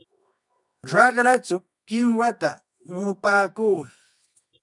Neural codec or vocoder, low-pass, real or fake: codec, 24 kHz, 0.9 kbps, WavTokenizer, medium music audio release; 10.8 kHz; fake